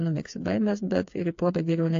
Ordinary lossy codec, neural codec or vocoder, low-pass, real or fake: AAC, 48 kbps; codec, 16 kHz, 4 kbps, FreqCodec, smaller model; 7.2 kHz; fake